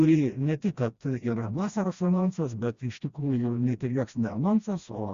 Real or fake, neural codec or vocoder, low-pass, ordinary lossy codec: fake; codec, 16 kHz, 1 kbps, FreqCodec, smaller model; 7.2 kHz; Opus, 64 kbps